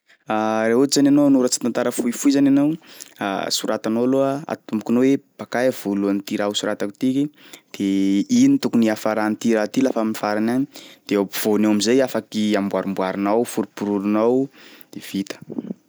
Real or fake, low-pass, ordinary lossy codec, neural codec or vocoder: real; none; none; none